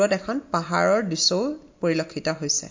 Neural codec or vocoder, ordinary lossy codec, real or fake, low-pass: none; MP3, 48 kbps; real; 7.2 kHz